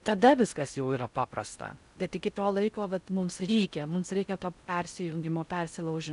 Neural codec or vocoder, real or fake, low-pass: codec, 16 kHz in and 24 kHz out, 0.6 kbps, FocalCodec, streaming, 4096 codes; fake; 10.8 kHz